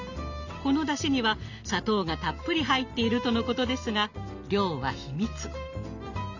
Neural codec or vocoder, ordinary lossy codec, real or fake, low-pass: none; none; real; 7.2 kHz